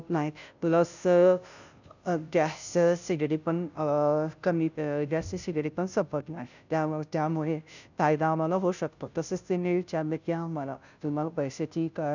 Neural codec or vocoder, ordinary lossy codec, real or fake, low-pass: codec, 16 kHz, 0.5 kbps, FunCodec, trained on Chinese and English, 25 frames a second; none; fake; 7.2 kHz